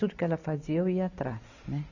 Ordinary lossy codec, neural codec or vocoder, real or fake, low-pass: none; none; real; 7.2 kHz